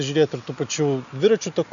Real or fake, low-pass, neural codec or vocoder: real; 7.2 kHz; none